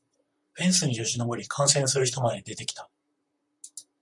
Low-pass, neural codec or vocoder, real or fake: 10.8 kHz; vocoder, 44.1 kHz, 128 mel bands, Pupu-Vocoder; fake